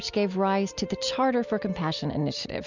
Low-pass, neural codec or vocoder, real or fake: 7.2 kHz; none; real